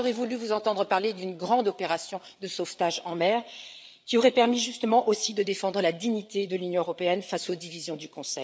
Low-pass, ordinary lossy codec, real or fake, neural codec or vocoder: none; none; fake; codec, 16 kHz, 8 kbps, FreqCodec, larger model